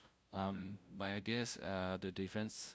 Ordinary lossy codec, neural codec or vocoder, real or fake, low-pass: none; codec, 16 kHz, 0.5 kbps, FunCodec, trained on LibriTTS, 25 frames a second; fake; none